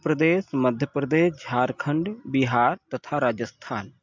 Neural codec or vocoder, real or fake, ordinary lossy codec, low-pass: none; real; MP3, 64 kbps; 7.2 kHz